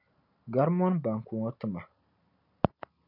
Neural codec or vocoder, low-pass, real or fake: none; 5.4 kHz; real